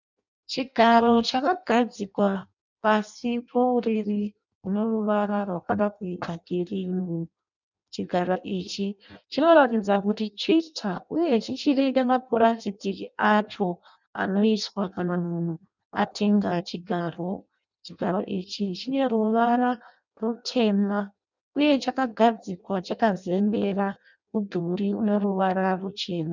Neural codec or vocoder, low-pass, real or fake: codec, 16 kHz in and 24 kHz out, 0.6 kbps, FireRedTTS-2 codec; 7.2 kHz; fake